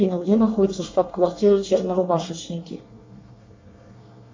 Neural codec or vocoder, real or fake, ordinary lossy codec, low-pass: codec, 24 kHz, 1 kbps, SNAC; fake; MP3, 48 kbps; 7.2 kHz